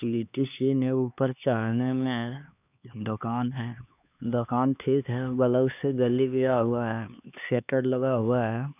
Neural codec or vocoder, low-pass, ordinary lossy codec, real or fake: codec, 16 kHz, 2 kbps, X-Codec, HuBERT features, trained on LibriSpeech; 3.6 kHz; none; fake